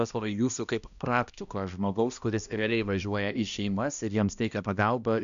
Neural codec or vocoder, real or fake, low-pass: codec, 16 kHz, 1 kbps, X-Codec, HuBERT features, trained on balanced general audio; fake; 7.2 kHz